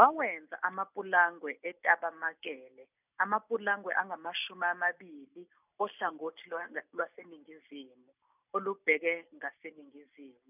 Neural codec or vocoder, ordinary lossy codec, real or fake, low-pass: none; none; real; 3.6 kHz